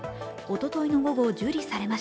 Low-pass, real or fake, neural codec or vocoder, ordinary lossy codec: none; real; none; none